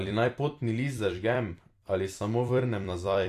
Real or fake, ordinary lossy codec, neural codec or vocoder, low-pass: fake; AAC, 64 kbps; vocoder, 44.1 kHz, 128 mel bands every 256 samples, BigVGAN v2; 14.4 kHz